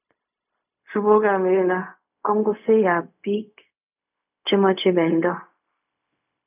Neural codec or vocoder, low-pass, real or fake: codec, 16 kHz, 0.4 kbps, LongCat-Audio-Codec; 3.6 kHz; fake